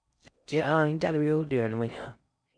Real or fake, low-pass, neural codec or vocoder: fake; 9.9 kHz; codec, 16 kHz in and 24 kHz out, 0.6 kbps, FocalCodec, streaming, 4096 codes